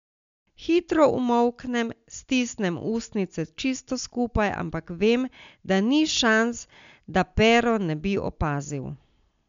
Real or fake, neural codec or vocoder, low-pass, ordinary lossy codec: real; none; 7.2 kHz; MP3, 64 kbps